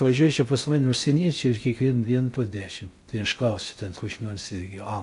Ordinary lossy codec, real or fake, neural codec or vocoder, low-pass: AAC, 64 kbps; fake; codec, 16 kHz in and 24 kHz out, 0.6 kbps, FocalCodec, streaming, 4096 codes; 10.8 kHz